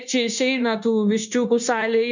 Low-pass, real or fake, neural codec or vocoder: 7.2 kHz; fake; codec, 16 kHz, 0.9 kbps, LongCat-Audio-Codec